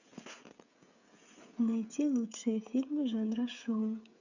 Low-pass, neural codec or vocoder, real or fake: 7.2 kHz; codec, 16 kHz, 16 kbps, FreqCodec, smaller model; fake